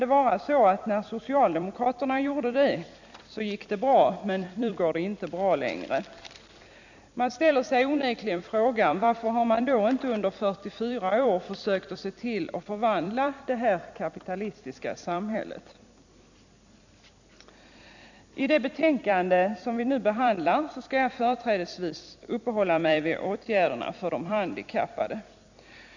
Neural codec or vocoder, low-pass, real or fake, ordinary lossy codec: vocoder, 44.1 kHz, 128 mel bands every 256 samples, BigVGAN v2; 7.2 kHz; fake; none